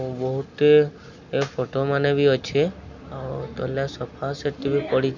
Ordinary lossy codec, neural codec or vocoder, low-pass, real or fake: none; none; 7.2 kHz; real